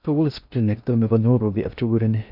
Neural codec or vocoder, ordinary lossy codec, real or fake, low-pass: codec, 16 kHz in and 24 kHz out, 0.6 kbps, FocalCodec, streaming, 2048 codes; none; fake; 5.4 kHz